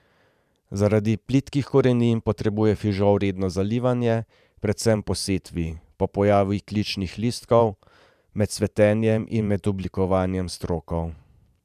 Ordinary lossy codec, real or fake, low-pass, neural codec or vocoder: none; fake; 14.4 kHz; vocoder, 44.1 kHz, 128 mel bands every 512 samples, BigVGAN v2